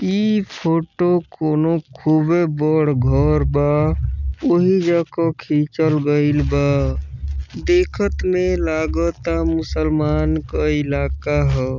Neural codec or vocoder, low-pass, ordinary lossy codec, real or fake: none; 7.2 kHz; none; real